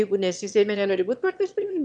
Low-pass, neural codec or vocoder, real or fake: 9.9 kHz; autoencoder, 22.05 kHz, a latent of 192 numbers a frame, VITS, trained on one speaker; fake